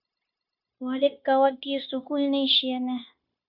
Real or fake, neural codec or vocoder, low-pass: fake; codec, 16 kHz, 0.9 kbps, LongCat-Audio-Codec; 5.4 kHz